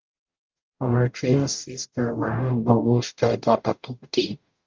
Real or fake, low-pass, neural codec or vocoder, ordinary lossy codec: fake; 7.2 kHz; codec, 44.1 kHz, 0.9 kbps, DAC; Opus, 24 kbps